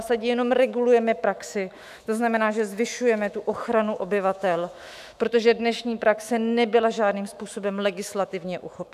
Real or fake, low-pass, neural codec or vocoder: fake; 14.4 kHz; autoencoder, 48 kHz, 128 numbers a frame, DAC-VAE, trained on Japanese speech